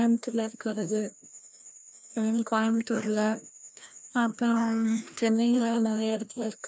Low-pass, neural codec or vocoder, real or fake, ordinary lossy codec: none; codec, 16 kHz, 1 kbps, FreqCodec, larger model; fake; none